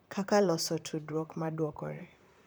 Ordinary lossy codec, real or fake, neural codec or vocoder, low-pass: none; fake; vocoder, 44.1 kHz, 128 mel bands, Pupu-Vocoder; none